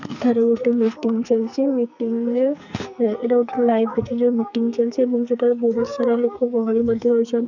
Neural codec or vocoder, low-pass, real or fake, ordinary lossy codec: codec, 44.1 kHz, 2.6 kbps, SNAC; 7.2 kHz; fake; none